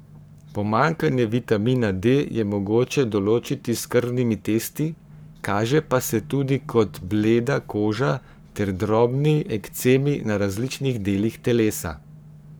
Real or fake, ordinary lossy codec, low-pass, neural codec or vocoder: fake; none; none; codec, 44.1 kHz, 7.8 kbps, Pupu-Codec